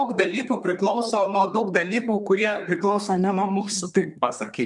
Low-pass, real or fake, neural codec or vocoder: 10.8 kHz; fake; codec, 24 kHz, 1 kbps, SNAC